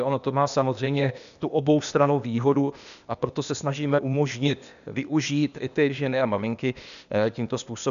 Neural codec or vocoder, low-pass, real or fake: codec, 16 kHz, 0.8 kbps, ZipCodec; 7.2 kHz; fake